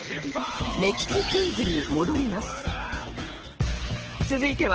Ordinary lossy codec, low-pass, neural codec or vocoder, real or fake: Opus, 16 kbps; 7.2 kHz; codec, 24 kHz, 6 kbps, HILCodec; fake